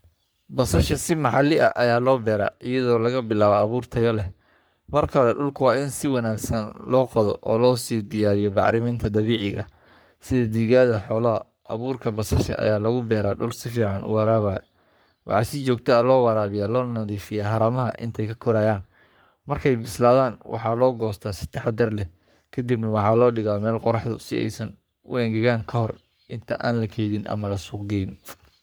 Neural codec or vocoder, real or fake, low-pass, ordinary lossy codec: codec, 44.1 kHz, 3.4 kbps, Pupu-Codec; fake; none; none